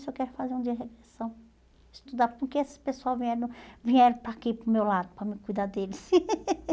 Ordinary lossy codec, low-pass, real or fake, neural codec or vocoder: none; none; real; none